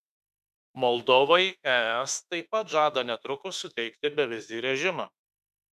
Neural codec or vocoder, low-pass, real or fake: autoencoder, 48 kHz, 32 numbers a frame, DAC-VAE, trained on Japanese speech; 14.4 kHz; fake